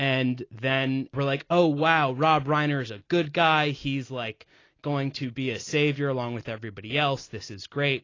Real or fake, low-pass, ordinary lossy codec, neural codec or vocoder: real; 7.2 kHz; AAC, 32 kbps; none